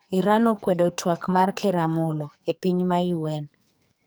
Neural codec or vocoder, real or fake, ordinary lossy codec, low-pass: codec, 44.1 kHz, 2.6 kbps, SNAC; fake; none; none